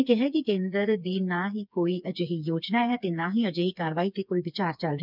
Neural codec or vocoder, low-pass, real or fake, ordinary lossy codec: codec, 16 kHz, 4 kbps, FreqCodec, smaller model; 5.4 kHz; fake; none